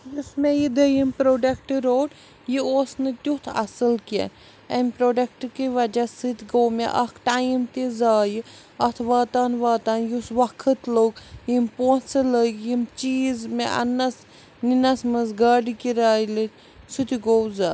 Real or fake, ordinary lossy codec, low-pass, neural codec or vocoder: real; none; none; none